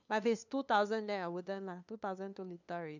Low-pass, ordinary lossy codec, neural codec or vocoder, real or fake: 7.2 kHz; none; codec, 16 kHz, 2 kbps, FunCodec, trained on LibriTTS, 25 frames a second; fake